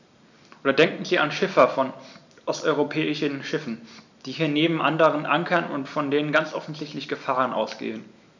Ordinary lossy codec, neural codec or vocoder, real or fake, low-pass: none; none; real; 7.2 kHz